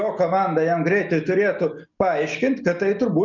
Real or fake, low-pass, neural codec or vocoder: real; 7.2 kHz; none